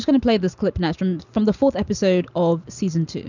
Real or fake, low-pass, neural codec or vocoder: real; 7.2 kHz; none